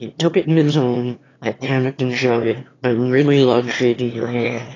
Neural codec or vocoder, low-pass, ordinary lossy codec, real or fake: autoencoder, 22.05 kHz, a latent of 192 numbers a frame, VITS, trained on one speaker; 7.2 kHz; AAC, 32 kbps; fake